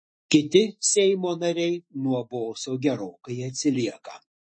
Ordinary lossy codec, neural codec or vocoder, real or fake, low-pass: MP3, 32 kbps; autoencoder, 48 kHz, 128 numbers a frame, DAC-VAE, trained on Japanese speech; fake; 9.9 kHz